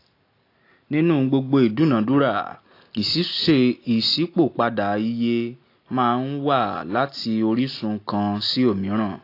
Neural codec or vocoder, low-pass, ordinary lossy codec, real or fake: none; 5.4 kHz; AAC, 32 kbps; real